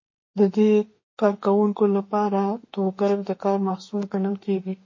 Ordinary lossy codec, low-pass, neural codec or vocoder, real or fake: MP3, 32 kbps; 7.2 kHz; autoencoder, 48 kHz, 32 numbers a frame, DAC-VAE, trained on Japanese speech; fake